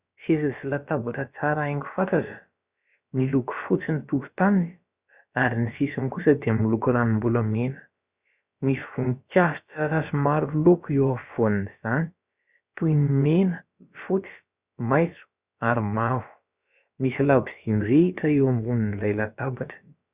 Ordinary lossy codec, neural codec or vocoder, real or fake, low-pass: Opus, 64 kbps; codec, 16 kHz, about 1 kbps, DyCAST, with the encoder's durations; fake; 3.6 kHz